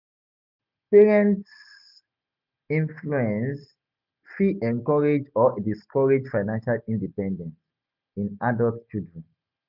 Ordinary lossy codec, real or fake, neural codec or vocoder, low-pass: none; real; none; 5.4 kHz